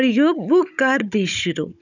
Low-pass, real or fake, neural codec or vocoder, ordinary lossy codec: 7.2 kHz; fake; codec, 16 kHz, 16 kbps, FunCodec, trained on Chinese and English, 50 frames a second; none